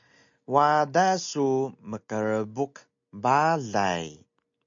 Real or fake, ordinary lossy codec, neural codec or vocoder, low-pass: real; MP3, 64 kbps; none; 7.2 kHz